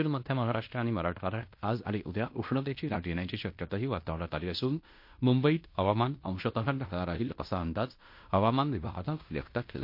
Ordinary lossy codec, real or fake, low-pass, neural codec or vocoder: MP3, 32 kbps; fake; 5.4 kHz; codec, 16 kHz in and 24 kHz out, 0.9 kbps, LongCat-Audio-Codec, fine tuned four codebook decoder